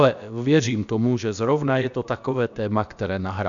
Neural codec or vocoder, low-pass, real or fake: codec, 16 kHz, about 1 kbps, DyCAST, with the encoder's durations; 7.2 kHz; fake